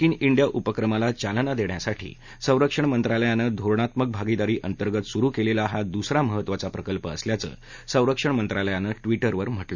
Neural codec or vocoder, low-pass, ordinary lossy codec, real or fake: none; 7.2 kHz; none; real